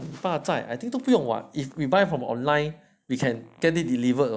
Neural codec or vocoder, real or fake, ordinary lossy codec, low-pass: none; real; none; none